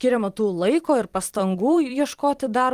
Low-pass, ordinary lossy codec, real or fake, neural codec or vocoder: 14.4 kHz; Opus, 24 kbps; fake; vocoder, 44.1 kHz, 128 mel bands every 256 samples, BigVGAN v2